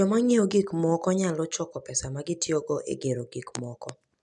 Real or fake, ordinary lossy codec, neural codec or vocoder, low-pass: real; none; none; 10.8 kHz